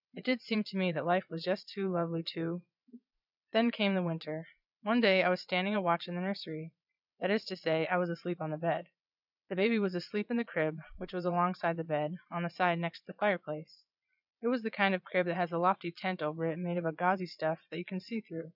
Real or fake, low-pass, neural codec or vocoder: fake; 5.4 kHz; vocoder, 22.05 kHz, 80 mel bands, Vocos